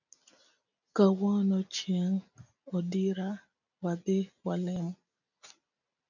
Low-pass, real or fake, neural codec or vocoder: 7.2 kHz; real; none